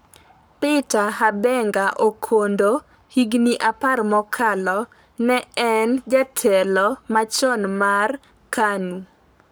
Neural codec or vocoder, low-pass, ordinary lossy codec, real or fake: codec, 44.1 kHz, 7.8 kbps, Pupu-Codec; none; none; fake